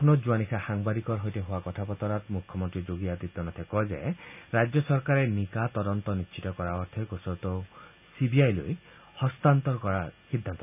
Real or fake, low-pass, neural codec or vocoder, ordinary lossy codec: real; 3.6 kHz; none; none